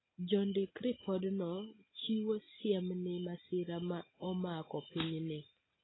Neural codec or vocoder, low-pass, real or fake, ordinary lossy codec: none; 7.2 kHz; real; AAC, 16 kbps